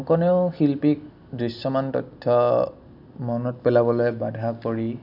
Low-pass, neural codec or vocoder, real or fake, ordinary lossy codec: 5.4 kHz; none; real; none